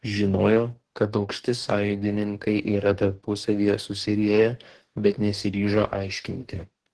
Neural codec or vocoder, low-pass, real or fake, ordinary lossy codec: codec, 44.1 kHz, 2.6 kbps, DAC; 10.8 kHz; fake; Opus, 16 kbps